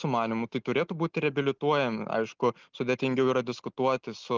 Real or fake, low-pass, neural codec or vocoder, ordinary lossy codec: real; 7.2 kHz; none; Opus, 32 kbps